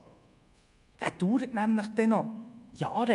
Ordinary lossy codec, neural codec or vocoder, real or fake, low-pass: none; codec, 24 kHz, 1.2 kbps, DualCodec; fake; 10.8 kHz